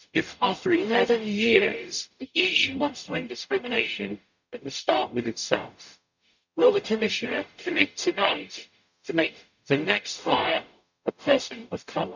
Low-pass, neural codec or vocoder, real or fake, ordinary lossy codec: 7.2 kHz; codec, 44.1 kHz, 0.9 kbps, DAC; fake; none